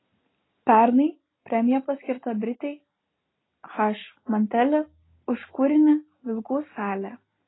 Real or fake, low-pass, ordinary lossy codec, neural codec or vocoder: real; 7.2 kHz; AAC, 16 kbps; none